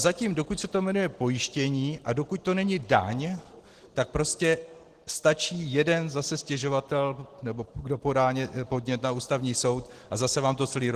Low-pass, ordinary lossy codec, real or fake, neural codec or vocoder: 14.4 kHz; Opus, 16 kbps; real; none